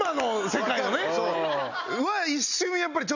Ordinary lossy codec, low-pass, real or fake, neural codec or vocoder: none; 7.2 kHz; real; none